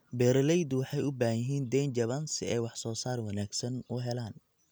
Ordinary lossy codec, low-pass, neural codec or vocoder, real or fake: none; none; none; real